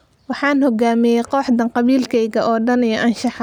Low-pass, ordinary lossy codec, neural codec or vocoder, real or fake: 19.8 kHz; none; none; real